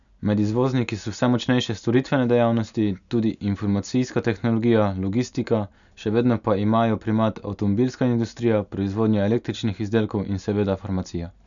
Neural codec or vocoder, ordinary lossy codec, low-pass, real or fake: none; none; 7.2 kHz; real